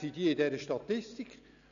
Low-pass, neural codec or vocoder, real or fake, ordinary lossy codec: 7.2 kHz; none; real; none